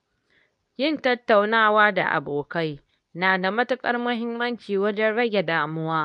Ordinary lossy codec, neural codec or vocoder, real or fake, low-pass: none; codec, 24 kHz, 0.9 kbps, WavTokenizer, medium speech release version 2; fake; 10.8 kHz